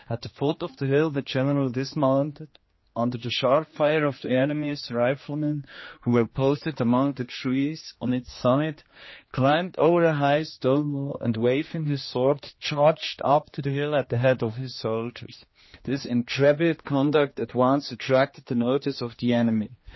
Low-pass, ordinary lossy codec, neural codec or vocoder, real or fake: 7.2 kHz; MP3, 24 kbps; codec, 16 kHz, 2 kbps, X-Codec, HuBERT features, trained on general audio; fake